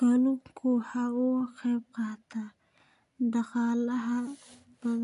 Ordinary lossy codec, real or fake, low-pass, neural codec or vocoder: AAC, 64 kbps; real; 10.8 kHz; none